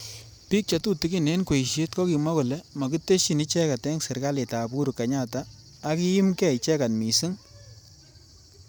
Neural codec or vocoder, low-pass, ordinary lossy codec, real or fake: vocoder, 44.1 kHz, 128 mel bands every 512 samples, BigVGAN v2; none; none; fake